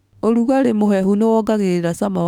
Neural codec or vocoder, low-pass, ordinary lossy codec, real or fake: autoencoder, 48 kHz, 32 numbers a frame, DAC-VAE, trained on Japanese speech; 19.8 kHz; none; fake